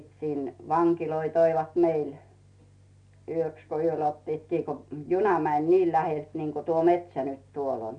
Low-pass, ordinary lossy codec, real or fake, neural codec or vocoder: 9.9 kHz; none; real; none